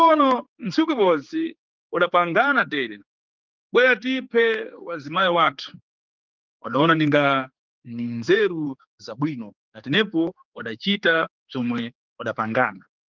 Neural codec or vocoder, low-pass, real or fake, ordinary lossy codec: codec, 16 kHz, 4 kbps, X-Codec, HuBERT features, trained on general audio; 7.2 kHz; fake; Opus, 32 kbps